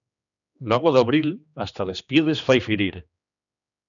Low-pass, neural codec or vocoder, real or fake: 7.2 kHz; codec, 16 kHz, 2 kbps, X-Codec, HuBERT features, trained on general audio; fake